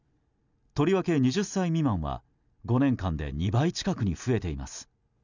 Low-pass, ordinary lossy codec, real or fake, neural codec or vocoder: 7.2 kHz; none; real; none